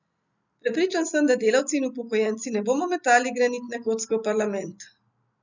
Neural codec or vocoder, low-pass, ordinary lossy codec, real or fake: none; 7.2 kHz; none; real